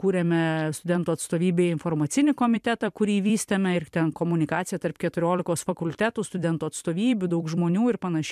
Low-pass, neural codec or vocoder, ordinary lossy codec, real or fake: 14.4 kHz; vocoder, 44.1 kHz, 128 mel bands every 256 samples, BigVGAN v2; MP3, 96 kbps; fake